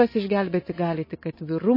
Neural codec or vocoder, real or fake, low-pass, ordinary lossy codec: none; real; 5.4 kHz; MP3, 24 kbps